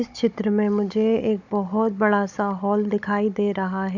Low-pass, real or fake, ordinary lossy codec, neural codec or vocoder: 7.2 kHz; fake; none; codec, 16 kHz, 16 kbps, FunCodec, trained on Chinese and English, 50 frames a second